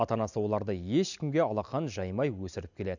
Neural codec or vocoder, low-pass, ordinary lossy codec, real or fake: none; 7.2 kHz; none; real